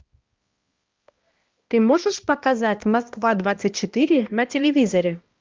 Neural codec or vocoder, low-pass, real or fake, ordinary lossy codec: codec, 16 kHz, 1 kbps, X-Codec, HuBERT features, trained on balanced general audio; 7.2 kHz; fake; Opus, 32 kbps